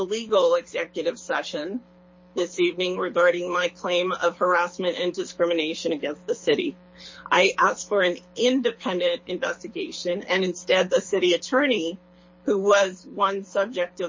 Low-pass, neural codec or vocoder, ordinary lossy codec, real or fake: 7.2 kHz; codec, 24 kHz, 6 kbps, HILCodec; MP3, 32 kbps; fake